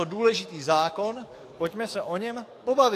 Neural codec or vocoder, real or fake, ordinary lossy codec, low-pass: codec, 44.1 kHz, 7.8 kbps, DAC; fake; AAC, 64 kbps; 14.4 kHz